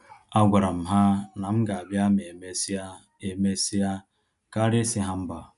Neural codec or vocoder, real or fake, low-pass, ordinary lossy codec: none; real; 10.8 kHz; none